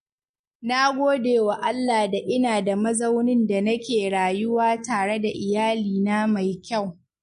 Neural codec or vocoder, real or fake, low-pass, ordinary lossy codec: none; real; 14.4 kHz; MP3, 48 kbps